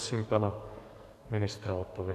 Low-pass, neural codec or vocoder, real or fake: 14.4 kHz; codec, 44.1 kHz, 2.6 kbps, SNAC; fake